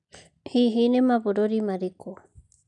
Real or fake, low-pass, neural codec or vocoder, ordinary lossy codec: real; 10.8 kHz; none; none